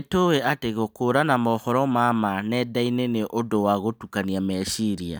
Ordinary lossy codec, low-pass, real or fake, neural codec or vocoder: none; none; real; none